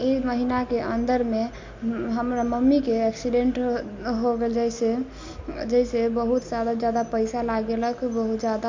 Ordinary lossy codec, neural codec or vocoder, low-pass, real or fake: MP3, 48 kbps; none; 7.2 kHz; real